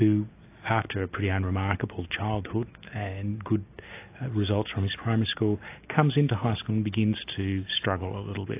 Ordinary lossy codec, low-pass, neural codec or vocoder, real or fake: AAC, 24 kbps; 3.6 kHz; none; real